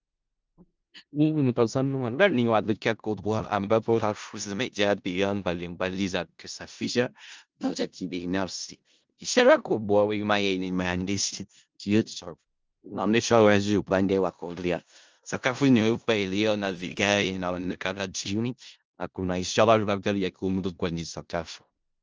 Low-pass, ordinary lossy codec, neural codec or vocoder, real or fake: 7.2 kHz; Opus, 24 kbps; codec, 16 kHz in and 24 kHz out, 0.4 kbps, LongCat-Audio-Codec, four codebook decoder; fake